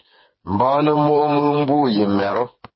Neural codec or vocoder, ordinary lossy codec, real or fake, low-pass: codec, 16 kHz, 4 kbps, FreqCodec, smaller model; MP3, 24 kbps; fake; 7.2 kHz